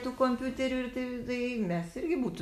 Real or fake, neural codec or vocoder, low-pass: real; none; 14.4 kHz